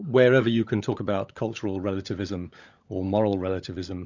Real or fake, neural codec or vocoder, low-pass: fake; codec, 16 kHz, 16 kbps, FunCodec, trained on LibriTTS, 50 frames a second; 7.2 kHz